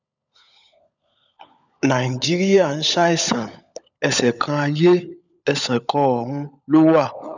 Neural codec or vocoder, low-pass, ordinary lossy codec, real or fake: codec, 16 kHz, 16 kbps, FunCodec, trained on LibriTTS, 50 frames a second; 7.2 kHz; none; fake